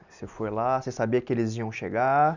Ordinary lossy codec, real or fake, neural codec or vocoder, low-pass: none; real; none; 7.2 kHz